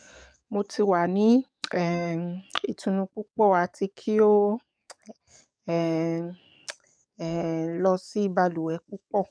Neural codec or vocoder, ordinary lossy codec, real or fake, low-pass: codec, 44.1 kHz, 7.8 kbps, DAC; none; fake; 9.9 kHz